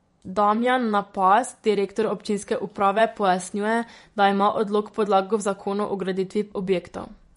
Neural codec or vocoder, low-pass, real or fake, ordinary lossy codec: vocoder, 24 kHz, 100 mel bands, Vocos; 10.8 kHz; fake; MP3, 48 kbps